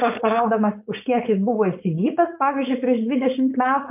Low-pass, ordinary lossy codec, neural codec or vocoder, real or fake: 3.6 kHz; MP3, 32 kbps; codec, 16 kHz, 4.8 kbps, FACodec; fake